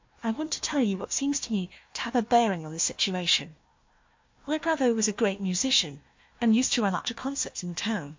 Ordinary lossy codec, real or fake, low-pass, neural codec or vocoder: MP3, 48 kbps; fake; 7.2 kHz; codec, 16 kHz, 1 kbps, FunCodec, trained on Chinese and English, 50 frames a second